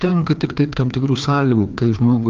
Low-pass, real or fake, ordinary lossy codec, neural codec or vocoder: 7.2 kHz; fake; Opus, 32 kbps; codec, 16 kHz, 2 kbps, FreqCodec, larger model